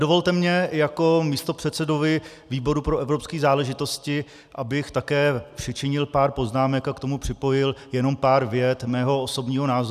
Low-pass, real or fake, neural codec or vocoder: 14.4 kHz; real; none